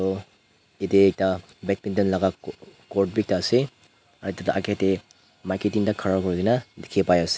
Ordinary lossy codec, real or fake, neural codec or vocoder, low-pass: none; real; none; none